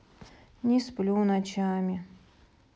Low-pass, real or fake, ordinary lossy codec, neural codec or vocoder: none; real; none; none